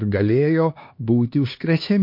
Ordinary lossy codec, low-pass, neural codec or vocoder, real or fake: MP3, 32 kbps; 5.4 kHz; codec, 16 kHz, 2 kbps, X-Codec, HuBERT features, trained on LibriSpeech; fake